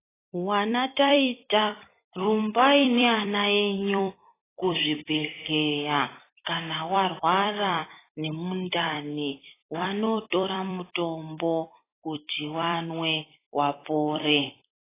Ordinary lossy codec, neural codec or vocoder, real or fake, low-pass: AAC, 16 kbps; vocoder, 44.1 kHz, 128 mel bands every 256 samples, BigVGAN v2; fake; 3.6 kHz